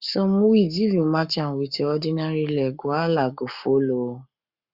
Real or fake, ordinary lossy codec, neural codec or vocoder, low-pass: fake; Opus, 64 kbps; codec, 44.1 kHz, 7.8 kbps, Pupu-Codec; 5.4 kHz